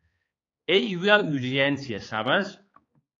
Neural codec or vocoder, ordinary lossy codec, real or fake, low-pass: codec, 16 kHz, 4 kbps, X-Codec, HuBERT features, trained on balanced general audio; AAC, 32 kbps; fake; 7.2 kHz